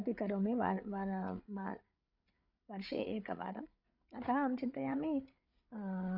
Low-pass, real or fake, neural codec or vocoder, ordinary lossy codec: 5.4 kHz; fake; codec, 16 kHz, 8 kbps, FreqCodec, larger model; none